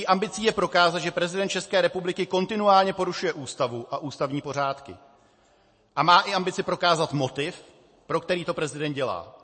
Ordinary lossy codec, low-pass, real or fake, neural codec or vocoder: MP3, 32 kbps; 9.9 kHz; real; none